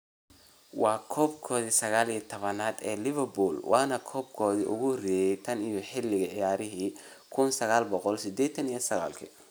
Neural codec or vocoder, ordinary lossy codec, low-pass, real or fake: none; none; none; real